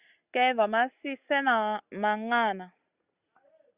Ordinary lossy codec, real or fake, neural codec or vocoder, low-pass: Opus, 64 kbps; real; none; 3.6 kHz